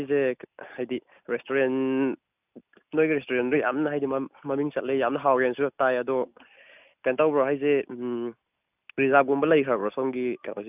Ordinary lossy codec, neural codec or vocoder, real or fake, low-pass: none; none; real; 3.6 kHz